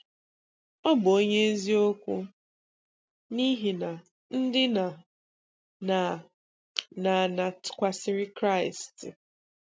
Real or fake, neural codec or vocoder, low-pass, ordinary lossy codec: real; none; none; none